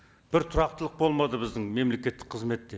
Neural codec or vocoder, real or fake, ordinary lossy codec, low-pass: none; real; none; none